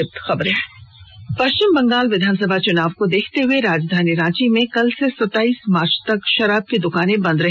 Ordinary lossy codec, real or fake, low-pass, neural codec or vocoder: none; real; none; none